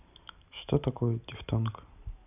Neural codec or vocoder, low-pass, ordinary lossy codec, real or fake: none; 3.6 kHz; none; real